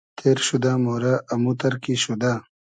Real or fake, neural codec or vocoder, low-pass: real; none; 9.9 kHz